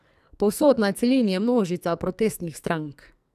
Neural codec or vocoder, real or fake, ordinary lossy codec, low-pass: codec, 44.1 kHz, 2.6 kbps, SNAC; fake; none; 14.4 kHz